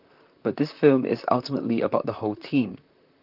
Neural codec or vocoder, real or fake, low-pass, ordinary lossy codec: vocoder, 44.1 kHz, 128 mel bands, Pupu-Vocoder; fake; 5.4 kHz; Opus, 32 kbps